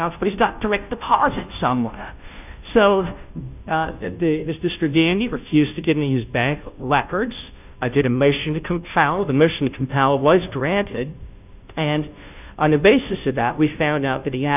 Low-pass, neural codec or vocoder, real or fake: 3.6 kHz; codec, 16 kHz, 0.5 kbps, FunCodec, trained on Chinese and English, 25 frames a second; fake